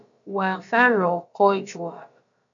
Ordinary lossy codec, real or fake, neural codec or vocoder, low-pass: AAC, 64 kbps; fake; codec, 16 kHz, about 1 kbps, DyCAST, with the encoder's durations; 7.2 kHz